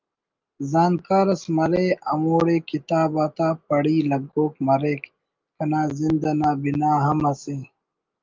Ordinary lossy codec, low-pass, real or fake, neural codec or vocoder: Opus, 32 kbps; 7.2 kHz; real; none